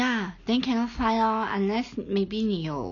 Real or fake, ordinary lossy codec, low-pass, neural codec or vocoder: real; none; 7.2 kHz; none